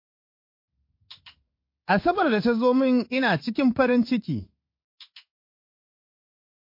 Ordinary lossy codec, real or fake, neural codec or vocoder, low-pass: MP3, 32 kbps; fake; codec, 16 kHz in and 24 kHz out, 1 kbps, XY-Tokenizer; 5.4 kHz